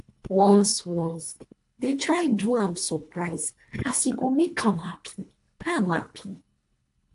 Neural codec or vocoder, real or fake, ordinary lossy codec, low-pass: codec, 24 kHz, 1.5 kbps, HILCodec; fake; AAC, 64 kbps; 10.8 kHz